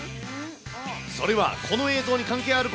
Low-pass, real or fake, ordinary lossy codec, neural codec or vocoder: none; real; none; none